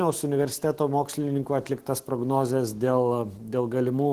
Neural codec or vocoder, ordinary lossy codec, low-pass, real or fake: autoencoder, 48 kHz, 128 numbers a frame, DAC-VAE, trained on Japanese speech; Opus, 16 kbps; 14.4 kHz; fake